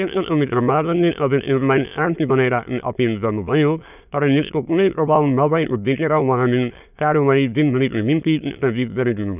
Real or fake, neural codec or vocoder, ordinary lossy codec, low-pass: fake; autoencoder, 22.05 kHz, a latent of 192 numbers a frame, VITS, trained on many speakers; none; 3.6 kHz